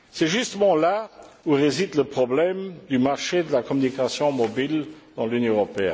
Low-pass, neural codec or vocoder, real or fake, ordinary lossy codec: none; none; real; none